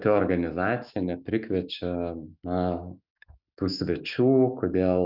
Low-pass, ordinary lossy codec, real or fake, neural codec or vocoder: 5.4 kHz; Opus, 64 kbps; fake; autoencoder, 48 kHz, 128 numbers a frame, DAC-VAE, trained on Japanese speech